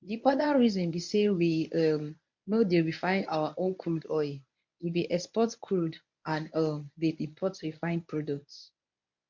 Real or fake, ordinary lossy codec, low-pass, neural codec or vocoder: fake; MP3, 48 kbps; 7.2 kHz; codec, 24 kHz, 0.9 kbps, WavTokenizer, medium speech release version 1